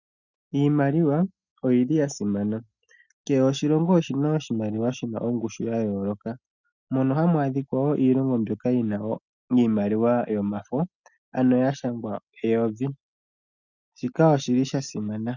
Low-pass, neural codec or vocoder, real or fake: 7.2 kHz; none; real